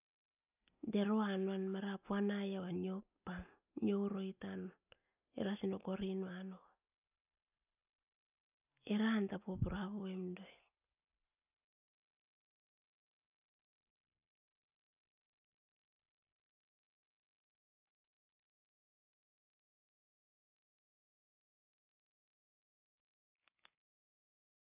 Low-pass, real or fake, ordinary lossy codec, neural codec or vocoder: 3.6 kHz; real; none; none